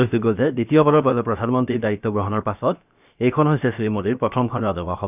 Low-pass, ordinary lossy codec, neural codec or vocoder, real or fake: 3.6 kHz; none; codec, 16 kHz, 0.7 kbps, FocalCodec; fake